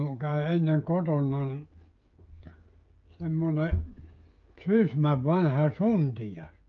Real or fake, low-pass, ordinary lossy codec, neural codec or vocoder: fake; 7.2 kHz; Opus, 24 kbps; codec, 16 kHz, 16 kbps, FreqCodec, smaller model